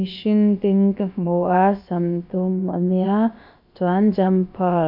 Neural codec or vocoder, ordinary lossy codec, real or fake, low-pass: codec, 16 kHz, about 1 kbps, DyCAST, with the encoder's durations; MP3, 48 kbps; fake; 5.4 kHz